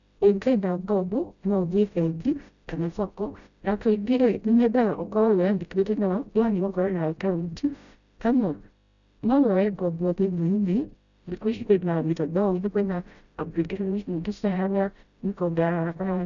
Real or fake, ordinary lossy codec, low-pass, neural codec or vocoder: fake; none; 7.2 kHz; codec, 16 kHz, 0.5 kbps, FreqCodec, smaller model